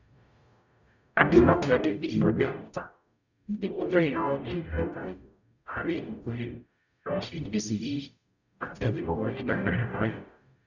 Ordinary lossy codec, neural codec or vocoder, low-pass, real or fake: none; codec, 44.1 kHz, 0.9 kbps, DAC; 7.2 kHz; fake